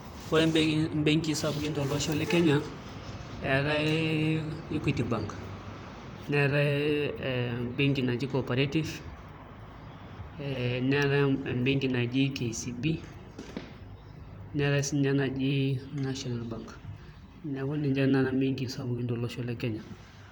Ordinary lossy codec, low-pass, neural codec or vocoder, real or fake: none; none; vocoder, 44.1 kHz, 128 mel bands, Pupu-Vocoder; fake